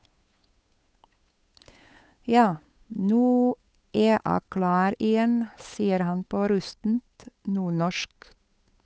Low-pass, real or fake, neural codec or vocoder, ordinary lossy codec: none; fake; codec, 16 kHz, 8 kbps, FunCodec, trained on Chinese and English, 25 frames a second; none